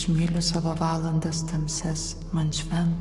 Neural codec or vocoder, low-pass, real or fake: codec, 44.1 kHz, 7.8 kbps, Pupu-Codec; 10.8 kHz; fake